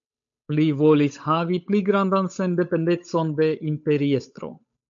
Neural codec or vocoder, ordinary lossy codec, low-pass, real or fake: codec, 16 kHz, 8 kbps, FunCodec, trained on Chinese and English, 25 frames a second; MP3, 64 kbps; 7.2 kHz; fake